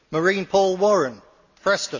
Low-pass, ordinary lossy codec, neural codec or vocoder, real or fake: 7.2 kHz; Opus, 64 kbps; none; real